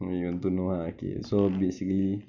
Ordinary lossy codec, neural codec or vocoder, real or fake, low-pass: none; none; real; 7.2 kHz